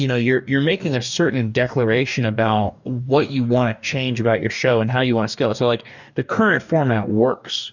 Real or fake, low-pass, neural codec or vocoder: fake; 7.2 kHz; codec, 44.1 kHz, 2.6 kbps, DAC